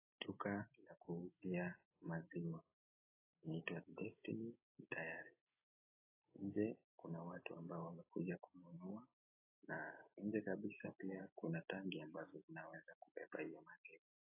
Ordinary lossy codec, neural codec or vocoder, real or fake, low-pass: AAC, 24 kbps; none; real; 3.6 kHz